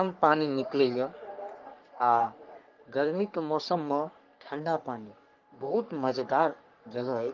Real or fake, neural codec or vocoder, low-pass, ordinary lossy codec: fake; codec, 44.1 kHz, 3.4 kbps, Pupu-Codec; 7.2 kHz; Opus, 32 kbps